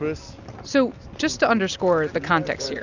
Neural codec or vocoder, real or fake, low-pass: none; real; 7.2 kHz